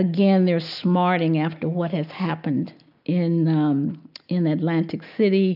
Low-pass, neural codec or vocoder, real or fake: 5.4 kHz; none; real